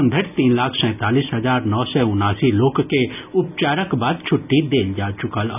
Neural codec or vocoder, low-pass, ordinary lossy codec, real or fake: none; 3.6 kHz; none; real